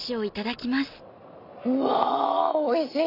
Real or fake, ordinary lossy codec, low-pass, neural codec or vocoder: real; none; 5.4 kHz; none